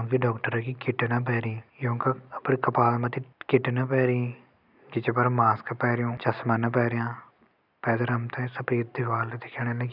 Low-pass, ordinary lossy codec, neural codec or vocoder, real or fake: 5.4 kHz; none; none; real